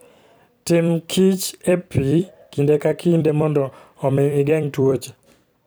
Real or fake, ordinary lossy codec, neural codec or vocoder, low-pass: fake; none; vocoder, 44.1 kHz, 128 mel bands every 256 samples, BigVGAN v2; none